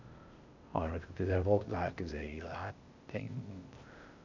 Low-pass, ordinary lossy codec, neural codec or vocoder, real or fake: 7.2 kHz; none; codec, 16 kHz, 0.8 kbps, ZipCodec; fake